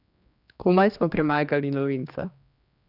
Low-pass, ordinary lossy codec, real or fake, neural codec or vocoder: 5.4 kHz; none; fake; codec, 16 kHz, 2 kbps, X-Codec, HuBERT features, trained on general audio